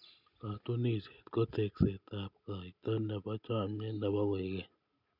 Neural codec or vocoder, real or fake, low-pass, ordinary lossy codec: none; real; 5.4 kHz; none